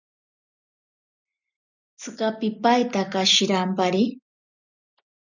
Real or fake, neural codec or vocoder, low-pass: real; none; 7.2 kHz